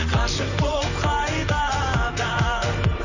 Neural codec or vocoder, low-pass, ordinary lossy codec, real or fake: vocoder, 44.1 kHz, 128 mel bands, Pupu-Vocoder; 7.2 kHz; none; fake